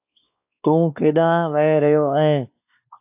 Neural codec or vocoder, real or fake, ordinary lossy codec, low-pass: codec, 16 kHz, 2 kbps, X-Codec, WavLM features, trained on Multilingual LibriSpeech; fake; AAC, 32 kbps; 3.6 kHz